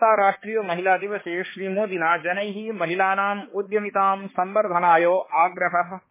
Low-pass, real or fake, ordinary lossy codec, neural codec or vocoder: 3.6 kHz; fake; MP3, 16 kbps; codec, 16 kHz, 2 kbps, X-Codec, HuBERT features, trained on balanced general audio